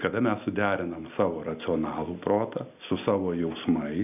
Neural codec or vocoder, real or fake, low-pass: none; real; 3.6 kHz